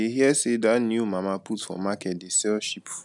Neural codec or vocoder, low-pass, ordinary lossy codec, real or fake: none; 10.8 kHz; none; real